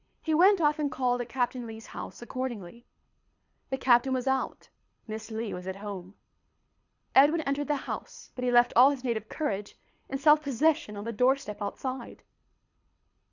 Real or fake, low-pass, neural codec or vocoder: fake; 7.2 kHz; codec, 24 kHz, 6 kbps, HILCodec